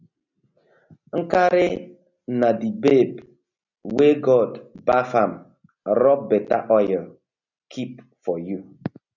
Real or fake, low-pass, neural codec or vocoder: real; 7.2 kHz; none